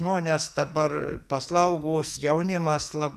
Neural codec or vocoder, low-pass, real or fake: codec, 44.1 kHz, 2.6 kbps, SNAC; 14.4 kHz; fake